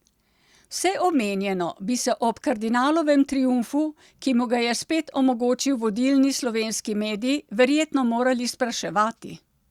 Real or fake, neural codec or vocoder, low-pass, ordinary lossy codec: real; none; 19.8 kHz; Opus, 64 kbps